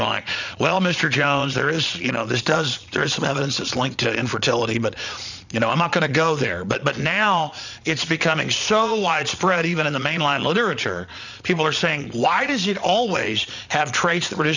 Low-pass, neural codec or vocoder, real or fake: 7.2 kHz; vocoder, 22.05 kHz, 80 mel bands, Vocos; fake